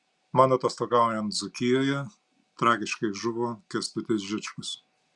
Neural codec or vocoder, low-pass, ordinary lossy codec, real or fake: none; 10.8 kHz; Opus, 64 kbps; real